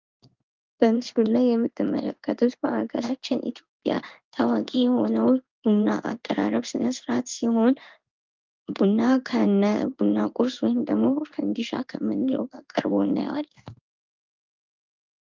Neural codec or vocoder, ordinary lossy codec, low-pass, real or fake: codec, 16 kHz in and 24 kHz out, 1 kbps, XY-Tokenizer; Opus, 24 kbps; 7.2 kHz; fake